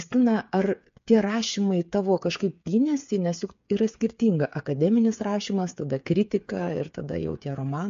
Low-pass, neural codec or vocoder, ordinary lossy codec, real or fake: 7.2 kHz; codec, 16 kHz, 8 kbps, FreqCodec, smaller model; MP3, 48 kbps; fake